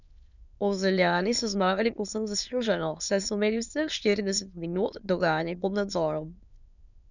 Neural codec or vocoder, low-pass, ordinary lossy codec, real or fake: autoencoder, 22.05 kHz, a latent of 192 numbers a frame, VITS, trained on many speakers; 7.2 kHz; none; fake